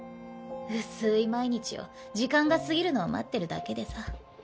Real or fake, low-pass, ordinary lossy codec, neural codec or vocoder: real; none; none; none